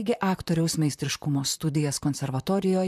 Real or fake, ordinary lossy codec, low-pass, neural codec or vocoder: fake; MP3, 96 kbps; 14.4 kHz; vocoder, 44.1 kHz, 128 mel bands, Pupu-Vocoder